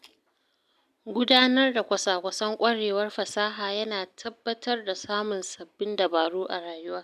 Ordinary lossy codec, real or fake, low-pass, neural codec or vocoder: none; real; 14.4 kHz; none